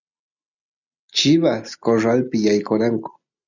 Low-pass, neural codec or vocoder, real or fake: 7.2 kHz; none; real